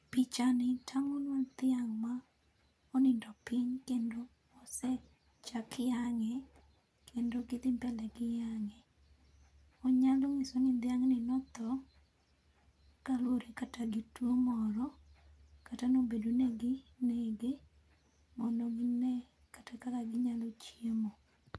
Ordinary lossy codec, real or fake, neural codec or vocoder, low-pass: none; real; none; none